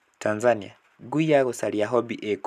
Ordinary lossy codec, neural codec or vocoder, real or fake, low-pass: none; none; real; 14.4 kHz